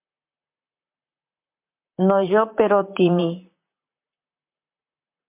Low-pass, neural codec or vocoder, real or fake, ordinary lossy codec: 3.6 kHz; vocoder, 44.1 kHz, 128 mel bands, Pupu-Vocoder; fake; MP3, 32 kbps